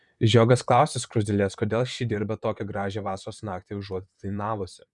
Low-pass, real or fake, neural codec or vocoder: 10.8 kHz; fake; vocoder, 44.1 kHz, 128 mel bands, Pupu-Vocoder